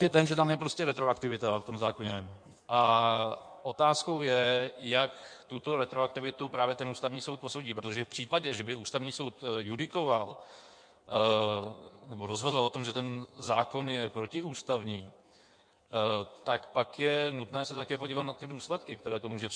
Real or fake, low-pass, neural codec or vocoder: fake; 9.9 kHz; codec, 16 kHz in and 24 kHz out, 1.1 kbps, FireRedTTS-2 codec